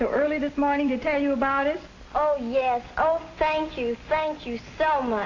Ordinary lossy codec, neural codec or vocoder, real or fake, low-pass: AAC, 32 kbps; none; real; 7.2 kHz